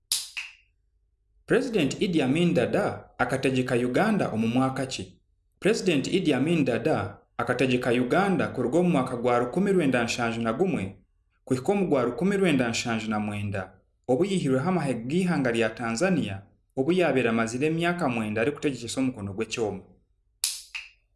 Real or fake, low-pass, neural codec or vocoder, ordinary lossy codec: real; none; none; none